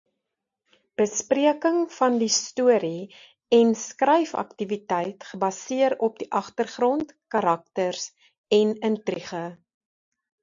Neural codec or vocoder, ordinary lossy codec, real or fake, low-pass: none; AAC, 64 kbps; real; 7.2 kHz